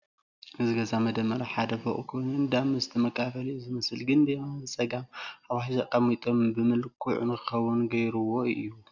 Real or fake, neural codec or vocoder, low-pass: real; none; 7.2 kHz